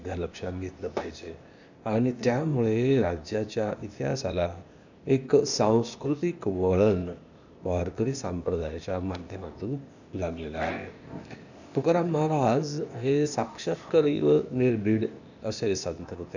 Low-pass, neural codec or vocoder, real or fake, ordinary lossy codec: 7.2 kHz; codec, 16 kHz, 0.8 kbps, ZipCodec; fake; none